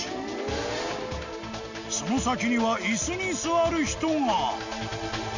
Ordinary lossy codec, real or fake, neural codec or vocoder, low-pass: none; real; none; 7.2 kHz